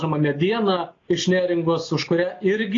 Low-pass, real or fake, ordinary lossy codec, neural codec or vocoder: 7.2 kHz; real; AAC, 32 kbps; none